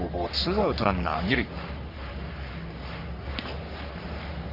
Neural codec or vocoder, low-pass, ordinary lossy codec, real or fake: codec, 44.1 kHz, 3.4 kbps, Pupu-Codec; 5.4 kHz; none; fake